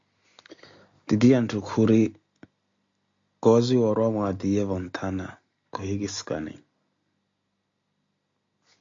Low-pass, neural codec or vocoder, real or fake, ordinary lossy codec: 7.2 kHz; none; real; AAC, 48 kbps